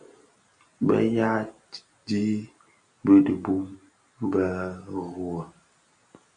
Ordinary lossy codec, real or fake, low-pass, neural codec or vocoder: AAC, 64 kbps; real; 9.9 kHz; none